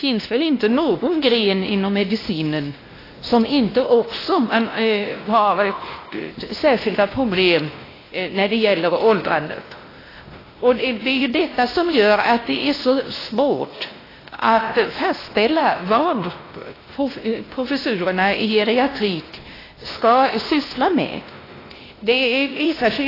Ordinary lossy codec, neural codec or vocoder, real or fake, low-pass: AAC, 24 kbps; codec, 16 kHz, 1 kbps, X-Codec, WavLM features, trained on Multilingual LibriSpeech; fake; 5.4 kHz